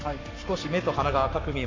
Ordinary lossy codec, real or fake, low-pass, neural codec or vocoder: AAC, 48 kbps; fake; 7.2 kHz; codec, 44.1 kHz, 7.8 kbps, Pupu-Codec